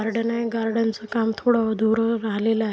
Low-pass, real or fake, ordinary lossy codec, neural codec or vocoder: none; real; none; none